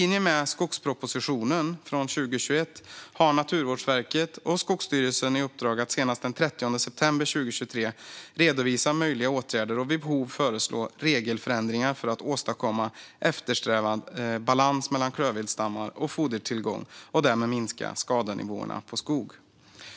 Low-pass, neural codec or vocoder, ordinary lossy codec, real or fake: none; none; none; real